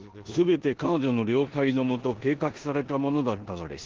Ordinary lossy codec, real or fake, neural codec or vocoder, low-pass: Opus, 16 kbps; fake; codec, 16 kHz in and 24 kHz out, 0.9 kbps, LongCat-Audio-Codec, four codebook decoder; 7.2 kHz